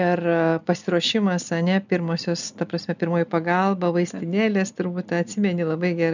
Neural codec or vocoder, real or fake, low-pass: none; real; 7.2 kHz